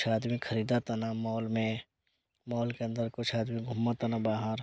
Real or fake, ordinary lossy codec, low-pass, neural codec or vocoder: real; none; none; none